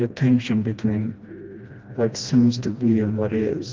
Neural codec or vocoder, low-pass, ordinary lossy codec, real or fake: codec, 16 kHz, 1 kbps, FreqCodec, smaller model; 7.2 kHz; Opus, 32 kbps; fake